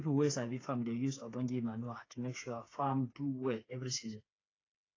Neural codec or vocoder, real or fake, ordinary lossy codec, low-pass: codec, 16 kHz, 4 kbps, FreqCodec, smaller model; fake; AAC, 32 kbps; 7.2 kHz